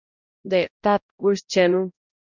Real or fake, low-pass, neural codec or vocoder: fake; 7.2 kHz; codec, 16 kHz, 0.5 kbps, X-Codec, HuBERT features, trained on balanced general audio